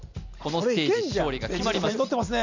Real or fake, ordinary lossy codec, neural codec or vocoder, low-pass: real; none; none; 7.2 kHz